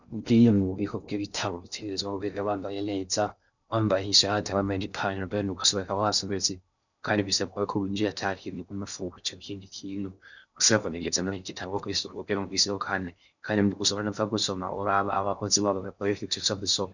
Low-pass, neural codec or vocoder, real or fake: 7.2 kHz; codec, 16 kHz in and 24 kHz out, 0.6 kbps, FocalCodec, streaming, 2048 codes; fake